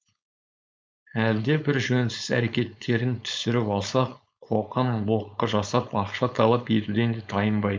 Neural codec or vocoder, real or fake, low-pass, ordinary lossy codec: codec, 16 kHz, 4.8 kbps, FACodec; fake; none; none